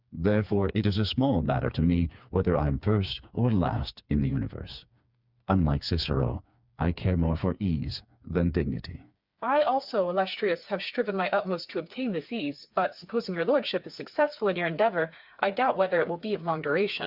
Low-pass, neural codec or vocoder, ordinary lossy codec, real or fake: 5.4 kHz; codec, 16 kHz, 4 kbps, FreqCodec, smaller model; Opus, 64 kbps; fake